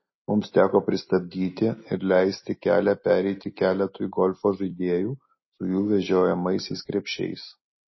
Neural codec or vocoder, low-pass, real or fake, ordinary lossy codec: none; 7.2 kHz; real; MP3, 24 kbps